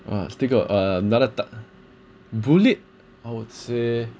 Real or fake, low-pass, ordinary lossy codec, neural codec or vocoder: real; none; none; none